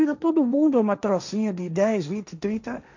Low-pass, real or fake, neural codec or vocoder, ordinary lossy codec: 7.2 kHz; fake; codec, 16 kHz, 1.1 kbps, Voila-Tokenizer; none